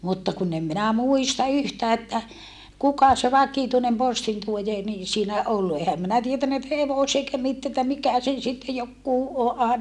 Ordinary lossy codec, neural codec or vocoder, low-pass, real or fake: none; none; none; real